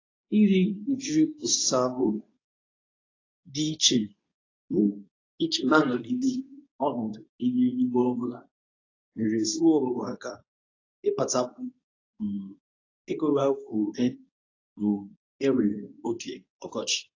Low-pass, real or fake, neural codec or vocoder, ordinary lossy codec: 7.2 kHz; fake; codec, 24 kHz, 0.9 kbps, WavTokenizer, medium speech release version 2; AAC, 32 kbps